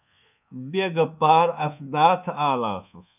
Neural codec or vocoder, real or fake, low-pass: codec, 24 kHz, 1.2 kbps, DualCodec; fake; 3.6 kHz